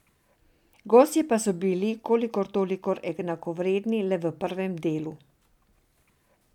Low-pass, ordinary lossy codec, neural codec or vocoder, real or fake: 19.8 kHz; none; none; real